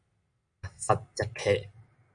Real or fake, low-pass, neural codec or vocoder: real; 9.9 kHz; none